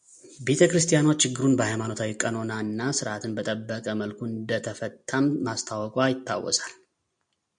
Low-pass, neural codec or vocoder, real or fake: 9.9 kHz; none; real